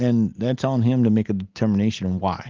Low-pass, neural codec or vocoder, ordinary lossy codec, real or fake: 7.2 kHz; none; Opus, 16 kbps; real